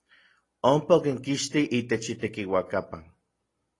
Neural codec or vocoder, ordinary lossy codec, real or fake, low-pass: none; AAC, 32 kbps; real; 10.8 kHz